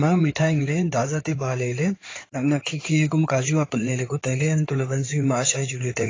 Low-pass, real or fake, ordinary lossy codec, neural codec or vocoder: 7.2 kHz; fake; AAC, 32 kbps; codec, 16 kHz in and 24 kHz out, 2.2 kbps, FireRedTTS-2 codec